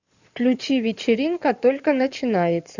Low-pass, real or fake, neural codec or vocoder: 7.2 kHz; fake; codec, 16 kHz in and 24 kHz out, 2.2 kbps, FireRedTTS-2 codec